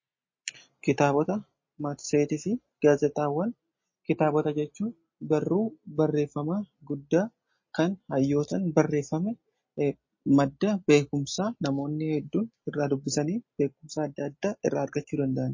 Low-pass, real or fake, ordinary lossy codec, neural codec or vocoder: 7.2 kHz; real; MP3, 32 kbps; none